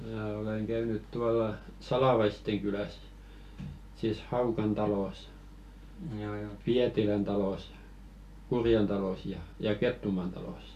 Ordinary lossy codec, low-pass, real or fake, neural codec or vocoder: none; 14.4 kHz; real; none